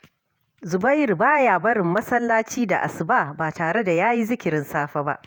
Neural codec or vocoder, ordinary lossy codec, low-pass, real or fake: vocoder, 48 kHz, 128 mel bands, Vocos; none; none; fake